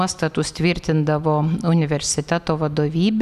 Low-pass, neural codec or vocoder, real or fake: 14.4 kHz; none; real